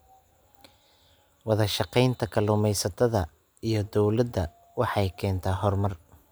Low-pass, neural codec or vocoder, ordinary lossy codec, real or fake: none; none; none; real